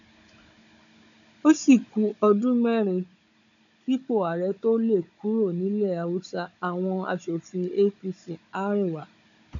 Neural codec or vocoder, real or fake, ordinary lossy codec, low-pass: codec, 16 kHz, 16 kbps, FunCodec, trained on Chinese and English, 50 frames a second; fake; none; 7.2 kHz